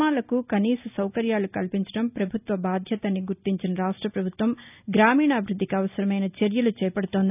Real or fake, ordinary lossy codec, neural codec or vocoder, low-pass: real; none; none; 3.6 kHz